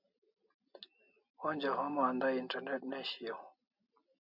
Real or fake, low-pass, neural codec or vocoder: real; 5.4 kHz; none